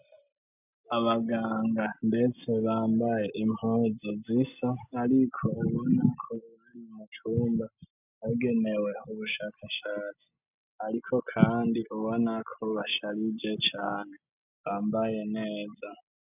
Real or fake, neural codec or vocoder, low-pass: real; none; 3.6 kHz